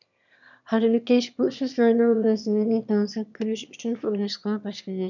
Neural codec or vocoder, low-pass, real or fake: autoencoder, 22.05 kHz, a latent of 192 numbers a frame, VITS, trained on one speaker; 7.2 kHz; fake